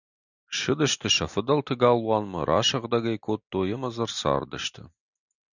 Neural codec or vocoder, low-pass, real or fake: none; 7.2 kHz; real